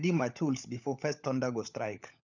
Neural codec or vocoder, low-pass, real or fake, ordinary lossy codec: codec, 16 kHz, 16 kbps, FunCodec, trained on LibriTTS, 50 frames a second; 7.2 kHz; fake; none